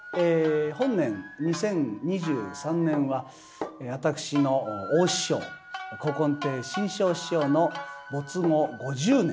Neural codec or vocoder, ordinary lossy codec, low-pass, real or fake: none; none; none; real